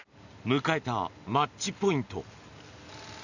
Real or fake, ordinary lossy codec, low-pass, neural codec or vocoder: real; none; 7.2 kHz; none